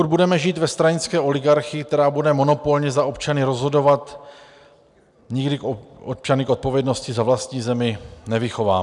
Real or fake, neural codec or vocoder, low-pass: real; none; 10.8 kHz